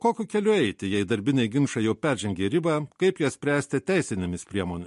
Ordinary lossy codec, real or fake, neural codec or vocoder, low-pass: MP3, 48 kbps; real; none; 14.4 kHz